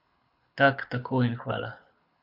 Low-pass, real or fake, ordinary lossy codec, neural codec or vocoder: 5.4 kHz; fake; none; codec, 24 kHz, 6 kbps, HILCodec